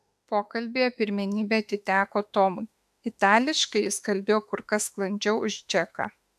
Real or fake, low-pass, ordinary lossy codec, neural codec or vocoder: fake; 14.4 kHz; AAC, 96 kbps; autoencoder, 48 kHz, 32 numbers a frame, DAC-VAE, trained on Japanese speech